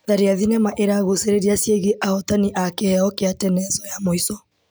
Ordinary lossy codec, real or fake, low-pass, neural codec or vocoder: none; real; none; none